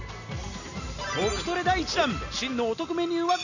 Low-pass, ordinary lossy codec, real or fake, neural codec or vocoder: 7.2 kHz; none; real; none